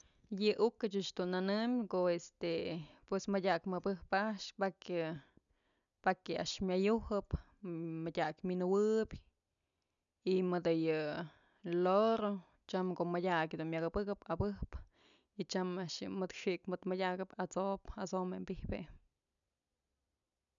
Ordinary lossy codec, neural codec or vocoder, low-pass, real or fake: none; none; 7.2 kHz; real